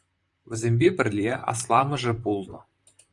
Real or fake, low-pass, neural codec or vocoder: fake; 10.8 kHz; vocoder, 44.1 kHz, 128 mel bands, Pupu-Vocoder